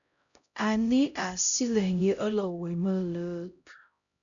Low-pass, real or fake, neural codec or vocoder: 7.2 kHz; fake; codec, 16 kHz, 0.5 kbps, X-Codec, HuBERT features, trained on LibriSpeech